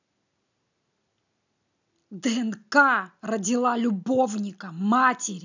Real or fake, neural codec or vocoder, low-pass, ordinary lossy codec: real; none; 7.2 kHz; none